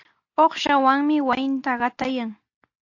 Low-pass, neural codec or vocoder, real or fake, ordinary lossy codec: 7.2 kHz; none; real; AAC, 48 kbps